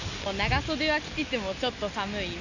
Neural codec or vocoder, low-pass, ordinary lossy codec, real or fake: none; 7.2 kHz; none; real